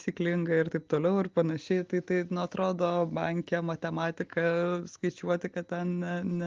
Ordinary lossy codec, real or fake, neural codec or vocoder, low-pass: Opus, 32 kbps; real; none; 7.2 kHz